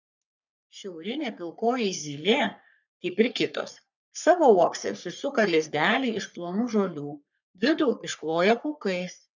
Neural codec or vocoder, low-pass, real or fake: codec, 44.1 kHz, 3.4 kbps, Pupu-Codec; 7.2 kHz; fake